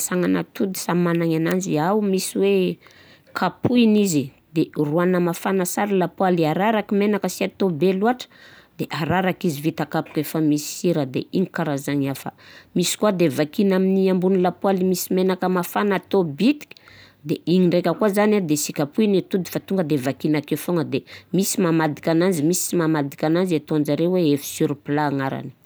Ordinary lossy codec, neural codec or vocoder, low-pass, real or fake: none; none; none; real